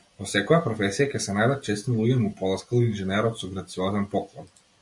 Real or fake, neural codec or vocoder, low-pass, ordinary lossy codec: real; none; 10.8 kHz; MP3, 64 kbps